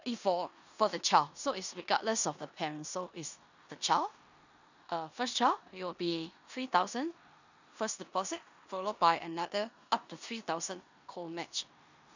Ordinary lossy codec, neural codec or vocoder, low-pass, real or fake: none; codec, 16 kHz in and 24 kHz out, 0.9 kbps, LongCat-Audio-Codec, four codebook decoder; 7.2 kHz; fake